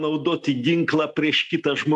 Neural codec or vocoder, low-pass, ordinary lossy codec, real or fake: none; 10.8 kHz; MP3, 96 kbps; real